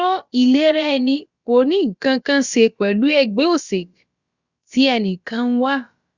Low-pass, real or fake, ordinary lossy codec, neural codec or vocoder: 7.2 kHz; fake; Opus, 64 kbps; codec, 16 kHz, about 1 kbps, DyCAST, with the encoder's durations